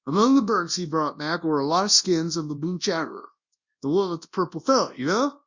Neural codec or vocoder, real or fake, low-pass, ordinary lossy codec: codec, 24 kHz, 0.9 kbps, WavTokenizer, large speech release; fake; 7.2 kHz; Opus, 64 kbps